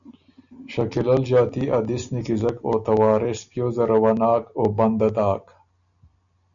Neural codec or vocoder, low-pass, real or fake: none; 7.2 kHz; real